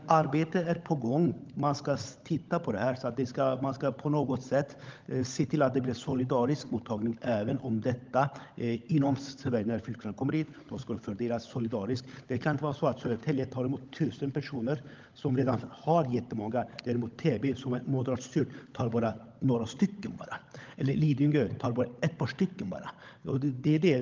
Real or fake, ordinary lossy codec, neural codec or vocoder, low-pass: fake; Opus, 32 kbps; codec, 16 kHz, 16 kbps, FunCodec, trained on LibriTTS, 50 frames a second; 7.2 kHz